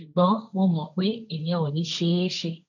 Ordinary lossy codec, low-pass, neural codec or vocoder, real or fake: none; 7.2 kHz; codec, 16 kHz, 1.1 kbps, Voila-Tokenizer; fake